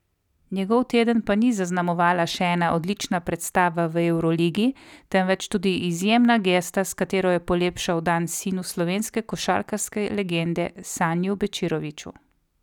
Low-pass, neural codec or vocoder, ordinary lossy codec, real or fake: 19.8 kHz; none; none; real